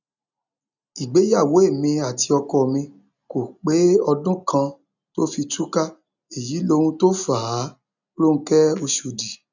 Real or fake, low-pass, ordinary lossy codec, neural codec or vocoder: real; 7.2 kHz; none; none